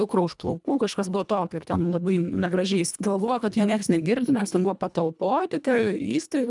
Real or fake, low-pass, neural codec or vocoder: fake; 10.8 kHz; codec, 24 kHz, 1.5 kbps, HILCodec